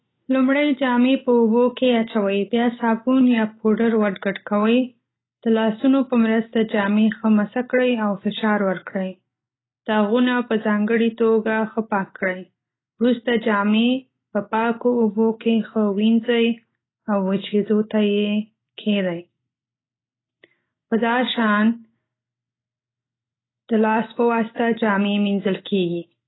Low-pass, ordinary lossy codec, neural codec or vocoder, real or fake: 7.2 kHz; AAC, 16 kbps; none; real